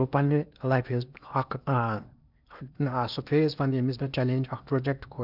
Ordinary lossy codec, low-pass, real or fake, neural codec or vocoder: none; 5.4 kHz; fake; codec, 16 kHz in and 24 kHz out, 0.8 kbps, FocalCodec, streaming, 65536 codes